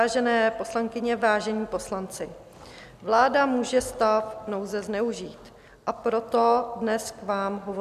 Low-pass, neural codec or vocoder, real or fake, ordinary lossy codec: 14.4 kHz; none; real; MP3, 96 kbps